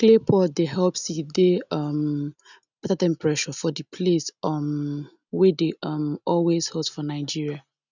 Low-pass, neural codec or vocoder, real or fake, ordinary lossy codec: 7.2 kHz; none; real; none